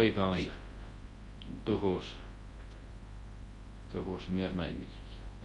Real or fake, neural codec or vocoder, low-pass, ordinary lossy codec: fake; codec, 24 kHz, 0.9 kbps, WavTokenizer, large speech release; 10.8 kHz; AAC, 32 kbps